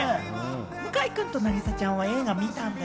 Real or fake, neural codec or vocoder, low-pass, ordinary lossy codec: real; none; none; none